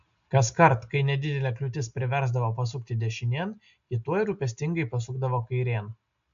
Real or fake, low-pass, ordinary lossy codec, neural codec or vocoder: real; 7.2 kHz; AAC, 64 kbps; none